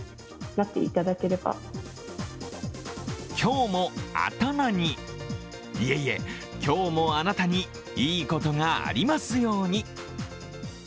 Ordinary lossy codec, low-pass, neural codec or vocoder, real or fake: none; none; none; real